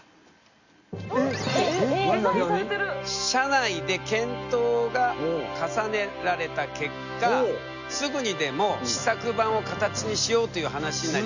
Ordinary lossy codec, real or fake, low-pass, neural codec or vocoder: none; real; 7.2 kHz; none